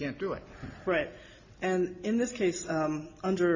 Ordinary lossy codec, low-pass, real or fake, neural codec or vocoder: AAC, 48 kbps; 7.2 kHz; real; none